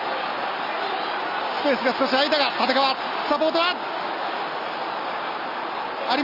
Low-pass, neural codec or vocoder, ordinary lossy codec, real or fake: 5.4 kHz; none; none; real